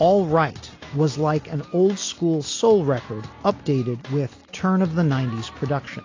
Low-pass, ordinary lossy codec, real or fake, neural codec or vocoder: 7.2 kHz; AAC, 32 kbps; real; none